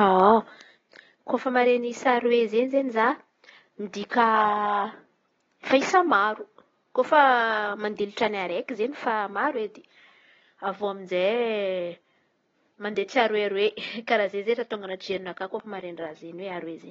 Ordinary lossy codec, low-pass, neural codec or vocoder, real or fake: AAC, 24 kbps; 7.2 kHz; none; real